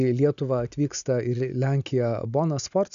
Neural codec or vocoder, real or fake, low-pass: none; real; 7.2 kHz